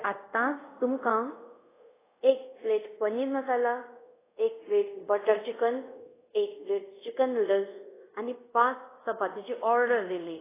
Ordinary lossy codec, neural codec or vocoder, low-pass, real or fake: AAC, 16 kbps; codec, 24 kHz, 0.5 kbps, DualCodec; 3.6 kHz; fake